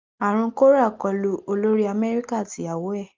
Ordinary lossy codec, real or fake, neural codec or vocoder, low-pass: Opus, 32 kbps; real; none; 7.2 kHz